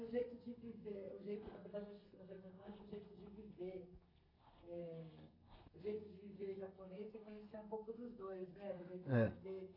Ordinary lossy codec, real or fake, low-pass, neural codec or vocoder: AAC, 32 kbps; fake; 5.4 kHz; codec, 32 kHz, 1.9 kbps, SNAC